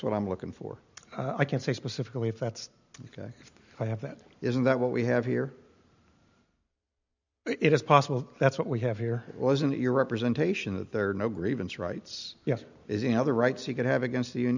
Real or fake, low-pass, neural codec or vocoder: real; 7.2 kHz; none